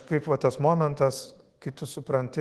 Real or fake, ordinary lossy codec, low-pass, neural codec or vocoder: fake; Opus, 16 kbps; 10.8 kHz; codec, 24 kHz, 1.2 kbps, DualCodec